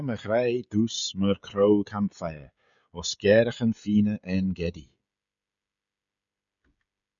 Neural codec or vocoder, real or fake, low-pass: codec, 16 kHz, 16 kbps, FreqCodec, smaller model; fake; 7.2 kHz